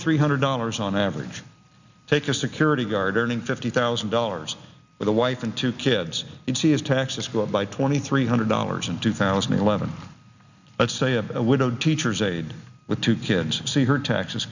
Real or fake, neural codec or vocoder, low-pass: real; none; 7.2 kHz